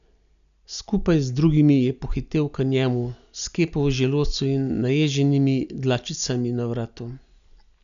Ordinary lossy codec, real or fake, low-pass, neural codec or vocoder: none; real; 7.2 kHz; none